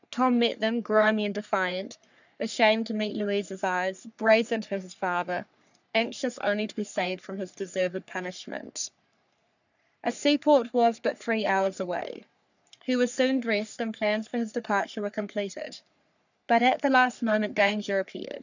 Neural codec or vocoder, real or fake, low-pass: codec, 44.1 kHz, 3.4 kbps, Pupu-Codec; fake; 7.2 kHz